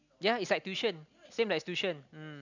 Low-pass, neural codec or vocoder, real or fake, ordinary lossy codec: 7.2 kHz; none; real; none